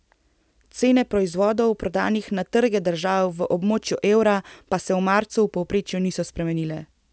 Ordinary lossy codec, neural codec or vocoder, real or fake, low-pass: none; none; real; none